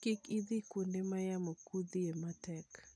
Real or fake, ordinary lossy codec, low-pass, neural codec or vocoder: real; none; none; none